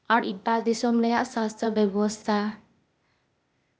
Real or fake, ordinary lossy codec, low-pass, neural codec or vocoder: fake; none; none; codec, 16 kHz, 0.8 kbps, ZipCodec